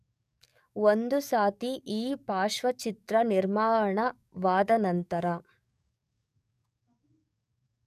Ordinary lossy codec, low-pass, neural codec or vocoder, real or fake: none; 14.4 kHz; codec, 44.1 kHz, 7.8 kbps, DAC; fake